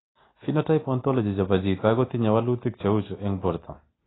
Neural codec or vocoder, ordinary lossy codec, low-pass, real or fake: none; AAC, 16 kbps; 7.2 kHz; real